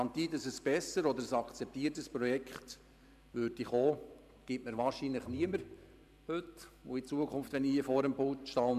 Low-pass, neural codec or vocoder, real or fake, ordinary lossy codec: 14.4 kHz; vocoder, 44.1 kHz, 128 mel bands every 512 samples, BigVGAN v2; fake; none